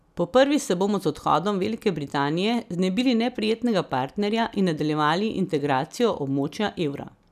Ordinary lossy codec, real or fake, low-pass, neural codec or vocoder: none; real; 14.4 kHz; none